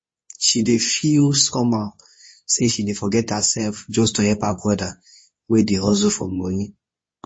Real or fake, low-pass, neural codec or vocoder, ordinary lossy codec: fake; 9.9 kHz; codec, 24 kHz, 0.9 kbps, WavTokenizer, medium speech release version 2; MP3, 32 kbps